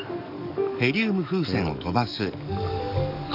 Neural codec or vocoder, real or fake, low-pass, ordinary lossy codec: codec, 44.1 kHz, 7.8 kbps, DAC; fake; 5.4 kHz; none